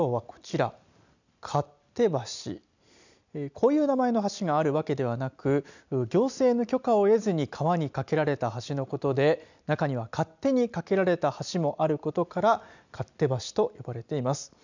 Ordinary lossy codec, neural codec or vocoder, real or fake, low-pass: none; none; real; 7.2 kHz